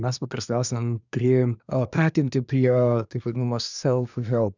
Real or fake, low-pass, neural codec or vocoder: fake; 7.2 kHz; codec, 24 kHz, 1 kbps, SNAC